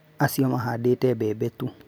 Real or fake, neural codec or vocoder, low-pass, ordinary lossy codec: real; none; none; none